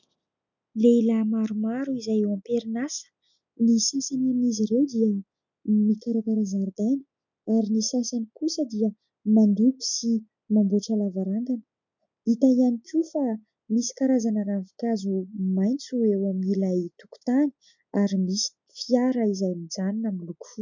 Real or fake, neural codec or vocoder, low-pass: real; none; 7.2 kHz